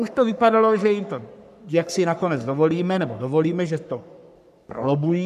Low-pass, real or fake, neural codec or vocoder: 14.4 kHz; fake; codec, 44.1 kHz, 3.4 kbps, Pupu-Codec